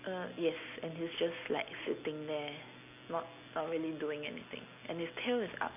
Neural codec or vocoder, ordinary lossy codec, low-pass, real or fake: none; none; 3.6 kHz; real